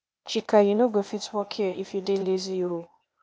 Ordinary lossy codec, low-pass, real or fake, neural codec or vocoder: none; none; fake; codec, 16 kHz, 0.8 kbps, ZipCodec